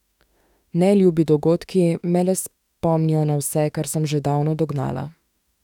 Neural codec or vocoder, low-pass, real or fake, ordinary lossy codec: autoencoder, 48 kHz, 32 numbers a frame, DAC-VAE, trained on Japanese speech; 19.8 kHz; fake; none